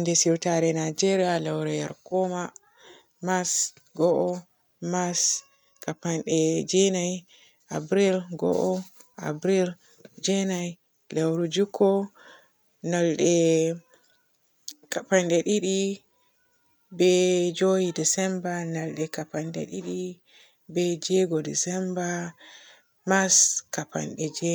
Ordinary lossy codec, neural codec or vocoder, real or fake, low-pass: none; none; real; none